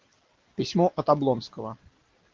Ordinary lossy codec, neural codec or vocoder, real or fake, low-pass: Opus, 16 kbps; none; real; 7.2 kHz